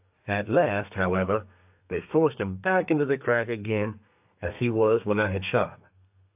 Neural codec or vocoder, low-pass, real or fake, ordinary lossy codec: codec, 32 kHz, 1.9 kbps, SNAC; 3.6 kHz; fake; AAC, 32 kbps